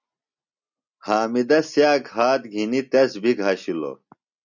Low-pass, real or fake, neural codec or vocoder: 7.2 kHz; real; none